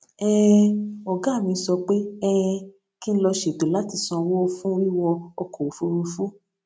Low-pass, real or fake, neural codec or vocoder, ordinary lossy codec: none; real; none; none